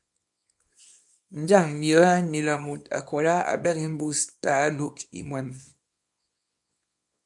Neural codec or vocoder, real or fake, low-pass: codec, 24 kHz, 0.9 kbps, WavTokenizer, small release; fake; 10.8 kHz